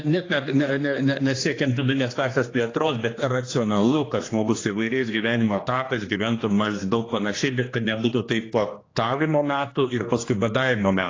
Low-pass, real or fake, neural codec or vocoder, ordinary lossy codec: 7.2 kHz; fake; codec, 16 kHz, 2 kbps, X-Codec, HuBERT features, trained on general audio; AAC, 32 kbps